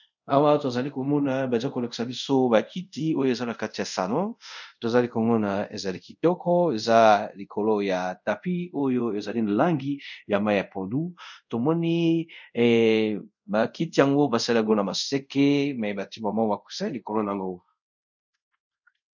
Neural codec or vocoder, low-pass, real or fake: codec, 24 kHz, 0.5 kbps, DualCodec; 7.2 kHz; fake